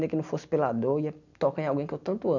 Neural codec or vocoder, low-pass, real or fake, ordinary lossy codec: none; 7.2 kHz; real; none